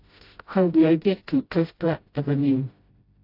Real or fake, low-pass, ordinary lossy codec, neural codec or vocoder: fake; 5.4 kHz; AAC, 32 kbps; codec, 16 kHz, 0.5 kbps, FreqCodec, smaller model